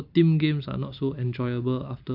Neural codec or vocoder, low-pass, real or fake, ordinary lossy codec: none; 5.4 kHz; real; none